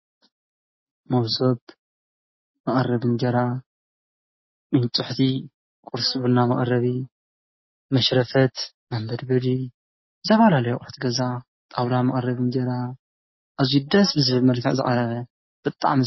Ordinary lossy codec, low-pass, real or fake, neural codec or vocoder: MP3, 24 kbps; 7.2 kHz; real; none